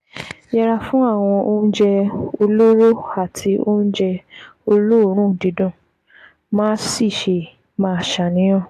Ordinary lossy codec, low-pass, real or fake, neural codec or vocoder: AAC, 64 kbps; 14.4 kHz; real; none